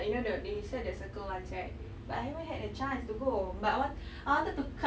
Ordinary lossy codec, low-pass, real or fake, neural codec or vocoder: none; none; real; none